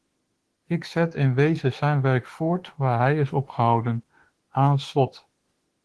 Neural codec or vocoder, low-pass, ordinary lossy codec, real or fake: autoencoder, 48 kHz, 32 numbers a frame, DAC-VAE, trained on Japanese speech; 10.8 kHz; Opus, 16 kbps; fake